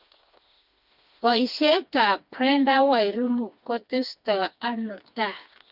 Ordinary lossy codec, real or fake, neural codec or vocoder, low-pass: Opus, 64 kbps; fake; codec, 16 kHz, 2 kbps, FreqCodec, smaller model; 5.4 kHz